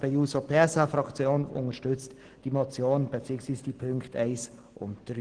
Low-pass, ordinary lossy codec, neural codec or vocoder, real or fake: 9.9 kHz; Opus, 16 kbps; none; real